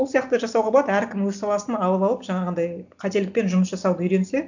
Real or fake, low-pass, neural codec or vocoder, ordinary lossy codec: real; 7.2 kHz; none; none